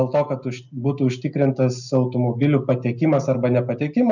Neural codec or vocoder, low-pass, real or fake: none; 7.2 kHz; real